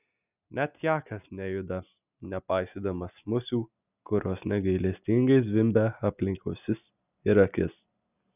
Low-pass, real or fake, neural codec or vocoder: 3.6 kHz; real; none